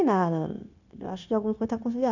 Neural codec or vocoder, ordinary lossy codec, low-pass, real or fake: codec, 16 kHz, 0.9 kbps, LongCat-Audio-Codec; none; 7.2 kHz; fake